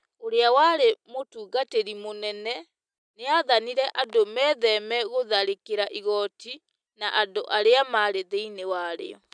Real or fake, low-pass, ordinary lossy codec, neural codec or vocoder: real; 9.9 kHz; none; none